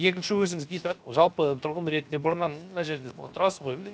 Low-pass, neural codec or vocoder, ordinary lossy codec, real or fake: none; codec, 16 kHz, 0.7 kbps, FocalCodec; none; fake